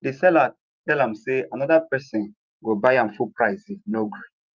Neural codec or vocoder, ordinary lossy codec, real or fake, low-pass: vocoder, 44.1 kHz, 128 mel bands every 512 samples, BigVGAN v2; Opus, 24 kbps; fake; 7.2 kHz